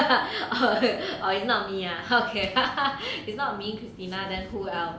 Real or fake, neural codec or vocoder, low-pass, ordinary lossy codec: real; none; none; none